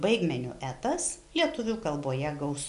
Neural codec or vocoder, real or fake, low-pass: none; real; 10.8 kHz